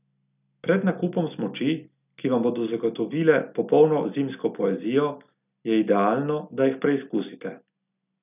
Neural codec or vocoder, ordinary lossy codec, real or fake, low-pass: none; none; real; 3.6 kHz